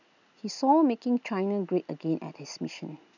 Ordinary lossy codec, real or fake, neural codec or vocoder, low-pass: none; real; none; 7.2 kHz